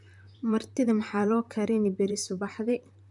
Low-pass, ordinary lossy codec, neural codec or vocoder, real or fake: 10.8 kHz; none; vocoder, 44.1 kHz, 128 mel bands, Pupu-Vocoder; fake